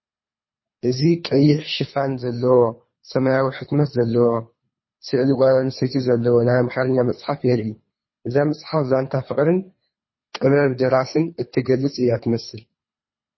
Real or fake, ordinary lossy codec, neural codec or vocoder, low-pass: fake; MP3, 24 kbps; codec, 24 kHz, 3 kbps, HILCodec; 7.2 kHz